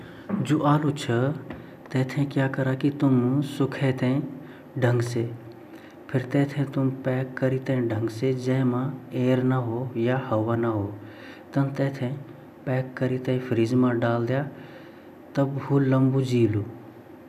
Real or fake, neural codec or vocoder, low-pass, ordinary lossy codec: real; none; 14.4 kHz; none